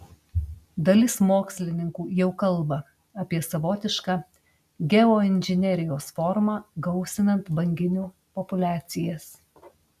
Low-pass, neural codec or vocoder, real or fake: 14.4 kHz; none; real